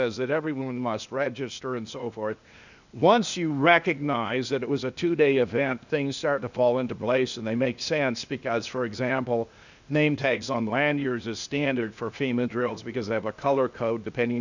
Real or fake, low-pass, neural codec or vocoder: fake; 7.2 kHz; codec, 16 kHz, 0.8 kbps, ZipCodec